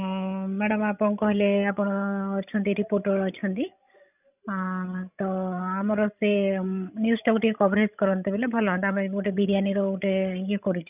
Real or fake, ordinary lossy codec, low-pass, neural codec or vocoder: fake; none; 3.6 kHz; codec, 16 kHz, 16 kbps, FreqCodec, larger model